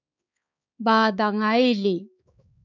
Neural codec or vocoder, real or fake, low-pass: codec, 16 kHz, 4 kbps, X-Codec, HuBERT features, trained on balanced general audio; fake; 7.2 kHz